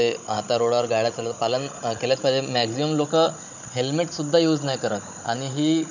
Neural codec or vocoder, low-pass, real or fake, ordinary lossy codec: codec, 16 kHz, 16 kbps, FunCodec, trained on Chinese and English, 50 frames a second; 7.2 kHz; fake; none